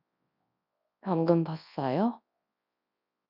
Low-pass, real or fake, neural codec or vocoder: 5.4 kHz; fake; codec, 24 kHz, 0.9 kbps, WavTokenizer, large speech release